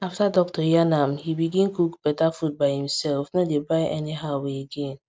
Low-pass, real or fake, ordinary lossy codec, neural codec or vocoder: none; real; none; none